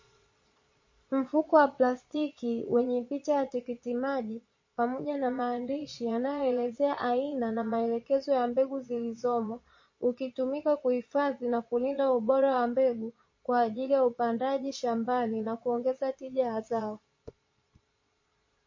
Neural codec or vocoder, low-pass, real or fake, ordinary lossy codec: vocoder, 22.05 kHz, 80 mel bands, Vocos; 7.2 kHz; fake; MP3, 32 kbps